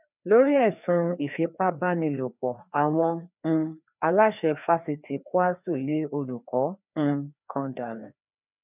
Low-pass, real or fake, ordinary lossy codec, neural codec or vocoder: 3.6 kHz; fake; none; codec, 16 kHz, 2 kbps, FreqCodec, larger model